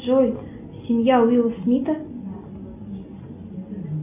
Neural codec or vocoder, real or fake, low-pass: none; real; 3.6 kHz